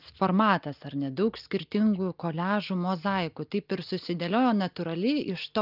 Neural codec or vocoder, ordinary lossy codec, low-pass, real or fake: none; Opus, 24 kbps; 5.4 kHz; real